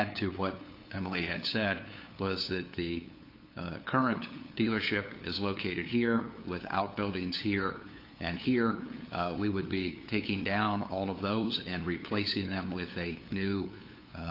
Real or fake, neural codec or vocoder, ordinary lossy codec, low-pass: fake; codec, 16 kHz, 8 kbps, FunCodec, trained on LibriTTS, 25 frames a second; AAC, 32 kbps; 5.4 kHz